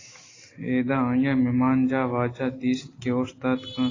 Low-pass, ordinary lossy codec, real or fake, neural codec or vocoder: 7.2 kHz; AAC, 32 kbps; real; none